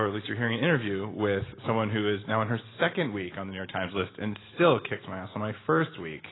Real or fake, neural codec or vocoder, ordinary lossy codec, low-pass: real; none; AAC, 16 kbps; 7.2 kHz